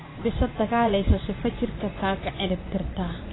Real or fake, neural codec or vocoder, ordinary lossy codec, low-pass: fake; vocoder, 24 kHz, 100 mel bands, Vocos; AAC, 16 kbps; 7.2 kHz